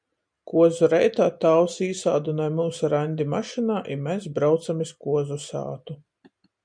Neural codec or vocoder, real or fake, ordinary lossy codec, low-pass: none; real; MP3, 96 kbps; 9.9 kHz